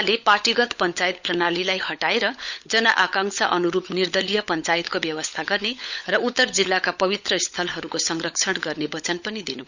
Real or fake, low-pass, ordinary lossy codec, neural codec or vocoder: fake; 7.2 kHz; none; codec, 16 kHz, 16 kbps, FunCodec, trained on LibriTTS, 50 frames a second